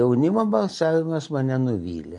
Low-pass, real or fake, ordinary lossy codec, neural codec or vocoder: 10.8 kHz; real; MP3, 48 kbps; none